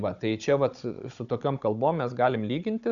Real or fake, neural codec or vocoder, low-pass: fake; codec, 16 kHz, 16 kbps, FunCodec, trained on Chinese and English, 50 frames a second; 7.2 kHz